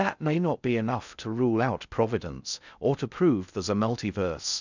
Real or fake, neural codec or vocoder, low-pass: fake; codec, 16 kHz in and 24 kHz out, 0.6 kbps, FocalCodec, streaming, 2048 codes; 7.2 kHz